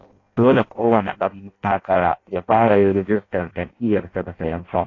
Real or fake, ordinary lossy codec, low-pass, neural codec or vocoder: fake; AAC, 32 kbps; 7.2 kHz; codec, 16 kHz in and 24 kHz out, 0.6 kbps, FireRedTTS-2 codec